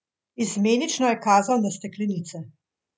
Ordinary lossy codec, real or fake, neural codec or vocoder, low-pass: none; real; none; none